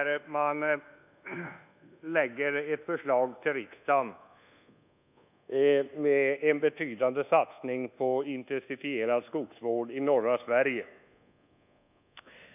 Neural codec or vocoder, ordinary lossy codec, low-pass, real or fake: codec, 24 kHz, 1.2 kbps, DualCodec; none; 3.6 kHz; fake